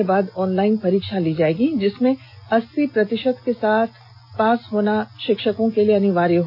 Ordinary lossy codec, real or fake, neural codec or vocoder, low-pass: none; real; none; 5.4 kHz